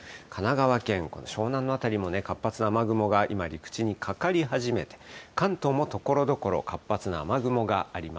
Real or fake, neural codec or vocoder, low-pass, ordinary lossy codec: real; none; none; none